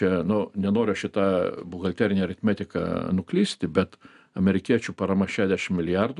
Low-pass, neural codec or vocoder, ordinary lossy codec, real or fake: 10.8 kHz; none; MP3, 96 kbps; real